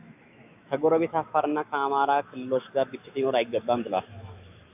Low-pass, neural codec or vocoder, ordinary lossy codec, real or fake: 3.6 kHz; autoencoder, 48 kHz, 128 numbers a frame, DAC-VAE, trained on Japanese speech; AAC, 32 kbps; fake